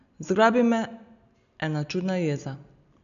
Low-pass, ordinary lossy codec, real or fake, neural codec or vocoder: 7.2 kHz; none; real; none